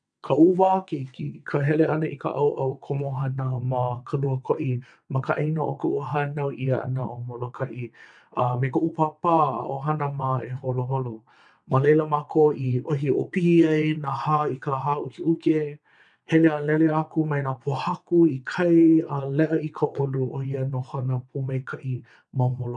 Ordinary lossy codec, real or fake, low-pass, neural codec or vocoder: none; fake; 9.9 kHz; vocoder, 22.05 kHz, 80 mel bands, WaveNeXt